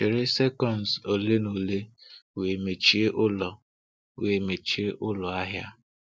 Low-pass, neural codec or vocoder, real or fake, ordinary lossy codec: none; none; real; none